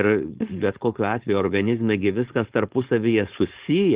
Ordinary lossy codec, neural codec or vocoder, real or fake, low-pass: Opus, 16 kbps; codec, 16 kHz, 4.8 kbps, FACodec; fake; 3.6 kHz